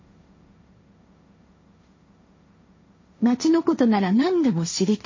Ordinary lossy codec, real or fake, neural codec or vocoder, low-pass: MP3, 32 kbps; fake; codec, 16 kHz, 1.1 kbps, Voila-Tokenizer; 7.2 kHz